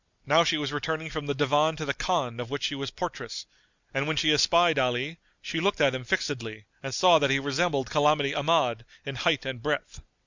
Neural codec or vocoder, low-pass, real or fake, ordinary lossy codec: none; 7.2 kHz; real; Opus, 64 kbps